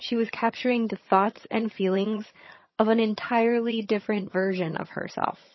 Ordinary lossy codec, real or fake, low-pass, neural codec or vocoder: MP3, 24 kbps; fake; 7.2 kHz; vocoder, 22.05 kHz, 80 mel bands, HiFi-GAN